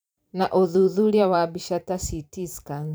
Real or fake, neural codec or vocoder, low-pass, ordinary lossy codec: fake; vocoder, 44.1 kHz, 128 mel bands every 512 samples, BigVGAN v2; none; none